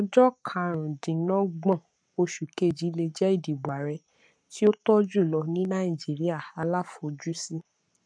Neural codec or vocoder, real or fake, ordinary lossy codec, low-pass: codec, 44.1 kHz, 7.8 kbps, Pupu-Codec; fake; none; 9.9 kHz